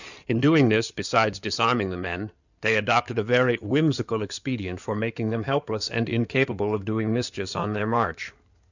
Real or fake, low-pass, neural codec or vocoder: fake; 7.2 kHz; codec, 16 kHz in and 24 kHz out, 2.2 kbps, FireRedTTS-2 codec